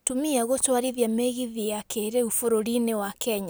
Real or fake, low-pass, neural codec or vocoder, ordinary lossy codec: real; none; none; none